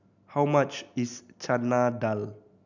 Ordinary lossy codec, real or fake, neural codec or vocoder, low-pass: none; real; none; 7.2 kHz